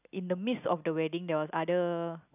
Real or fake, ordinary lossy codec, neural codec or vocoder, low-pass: real; none; none; 3.6 kHz